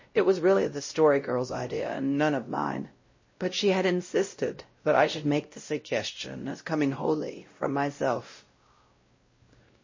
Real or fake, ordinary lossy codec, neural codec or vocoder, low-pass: fake; MP3, 32 kbps; codec, 16 kHz, 0.5 kbps, X-Codec, WavLM features, trained on Multilingual LibriSpeech; 7.2 kHz